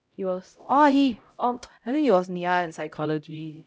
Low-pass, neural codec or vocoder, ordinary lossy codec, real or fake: none; codec, 16 kHz, 0.5 kbps, X-Codec, HuBERT features, trained on LibriSpeech; none; fake